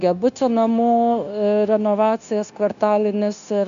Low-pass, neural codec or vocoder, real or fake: 7.2 kHz; codec, 16 kHz, 0.9 kbps, LongCat-Audio-Codec; fake